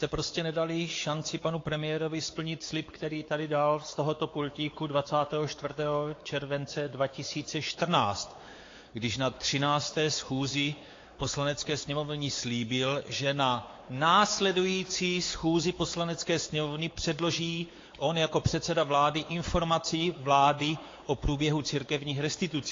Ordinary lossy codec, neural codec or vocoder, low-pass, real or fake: AAC, 32 kbps; codec, 16 kHz, 4 kbps, X-Codec, WavLM features, trained on Multilingual LibriSpeech; 7.2 kHz; fake